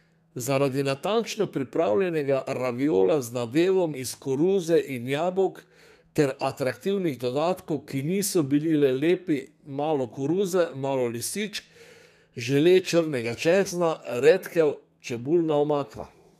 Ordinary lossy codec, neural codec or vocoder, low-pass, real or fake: none; codec, 32 kHz, 1.9 kbps, SNAC; 14.4 kHz; fake